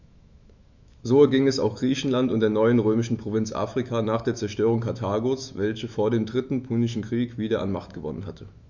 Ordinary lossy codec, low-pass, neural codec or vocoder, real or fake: none; 7.2 kHz; autoencoder, 48 kHz, 128 numbers a frame, DAC-VAE, trained on Japanese speech; fake